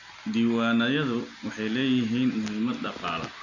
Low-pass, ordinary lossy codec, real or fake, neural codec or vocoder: 7.2 kHz; none; real; none